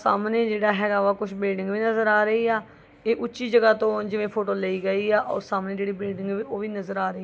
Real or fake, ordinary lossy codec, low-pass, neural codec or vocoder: real; none; none; none